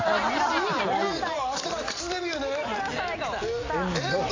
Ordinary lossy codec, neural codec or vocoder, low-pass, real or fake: AAC, 32 kbps; none; 7.2 kHz; real